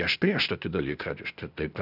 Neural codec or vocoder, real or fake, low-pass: codec, 16 kHz, 0.8 kbps, ZipCodec; fake; 5.4 kHz